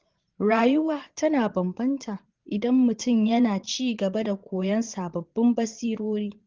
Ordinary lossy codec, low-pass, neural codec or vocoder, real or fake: Opus, 16 kbps; 7.2 kHz; vocoder, 22.05 kHz, 80 mel bands, Vocos; fake